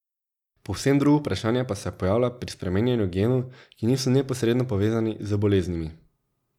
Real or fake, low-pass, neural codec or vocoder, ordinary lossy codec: real; 19.8 kHz; none; none